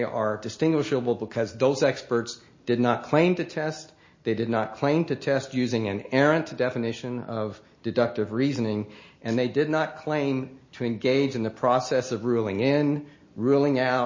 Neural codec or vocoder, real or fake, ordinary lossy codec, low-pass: none; real; MP3, 32 kbps; 7.2 kHz